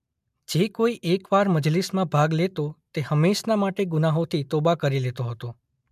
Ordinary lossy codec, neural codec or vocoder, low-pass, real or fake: MP3, 96 kbps; none; 14.4 kHz; real